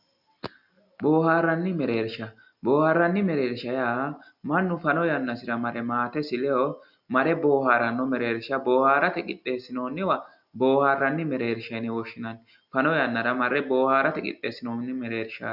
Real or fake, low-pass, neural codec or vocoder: real; 5.4 kHz; none